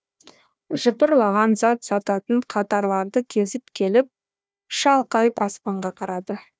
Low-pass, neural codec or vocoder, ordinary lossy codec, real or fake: none; codec, 16 kHz, 1 kbps, FunCodec, trained on Chinese and English, 50 frames a second; none; fake